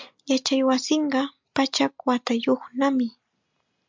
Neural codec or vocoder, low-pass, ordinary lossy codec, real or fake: none; 7.2 kHz; MP3, 64 kbps; real